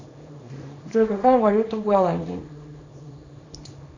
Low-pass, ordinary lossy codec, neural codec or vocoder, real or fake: 7.2 kHz; AAC, 32 kbps; codec, 24 kHz, 0.9 kbps, WavTokenizer, small release; fake